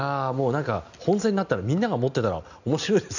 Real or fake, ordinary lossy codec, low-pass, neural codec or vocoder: real; none; 7.2 kHz; none